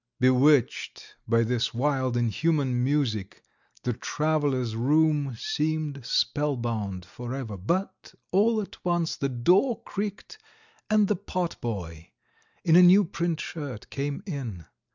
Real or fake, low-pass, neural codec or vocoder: real; 7.2 kHz; none